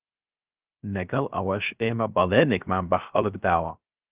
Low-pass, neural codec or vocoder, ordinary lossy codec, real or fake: 3.6 kHz; codec, 16 kHz, 0.3 kbps, FocalCodec; Opus, 32 kbps; fake